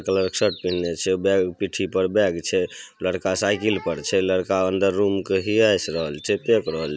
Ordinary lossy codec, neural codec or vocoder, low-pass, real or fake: none; none; none; real